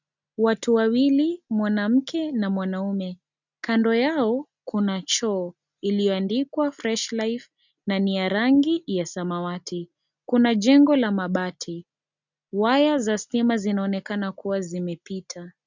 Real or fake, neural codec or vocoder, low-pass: real; none; 7.2 kHz